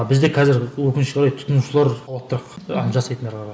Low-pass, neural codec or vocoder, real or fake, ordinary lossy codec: none; none; real; none